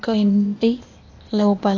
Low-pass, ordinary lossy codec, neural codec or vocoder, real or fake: 7.2 kHz; AAC, 48 kbps; codec, 16 kHz, 0.8 kbps, ZipCodec; fake